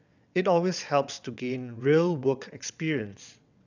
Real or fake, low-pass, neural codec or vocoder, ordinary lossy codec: fake; 7.2 kHz; vocoder, 22.05 kHz, 80 mel bands, WaveNeXt; none